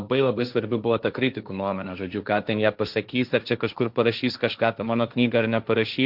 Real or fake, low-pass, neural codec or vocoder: fake; 5.4 kHz; codec, 16 kHz, 1.1 kbps, Voila-Tokenizer